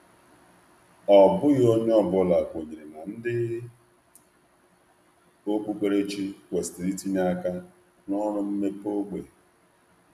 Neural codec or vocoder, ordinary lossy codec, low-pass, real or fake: none; none; 14.4 kHz; real